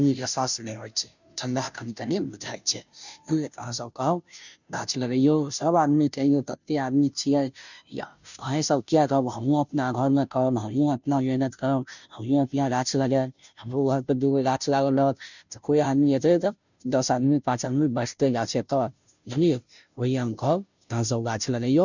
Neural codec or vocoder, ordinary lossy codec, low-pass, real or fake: codec, 16 kHz, 0.5 kbps, FunCodec, trained on Chinese and English, 25 frames a second; none; 7.2 kHz; fake